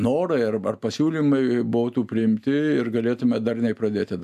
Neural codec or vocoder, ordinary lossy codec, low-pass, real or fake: none; AAC, 96 kbps; 14.4 kHz; real